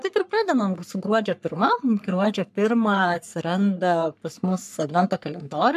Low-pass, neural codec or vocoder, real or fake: 14.4 kHz; codec, 44.1 kHz, 3.4 kbps, Pupu-Codec; fake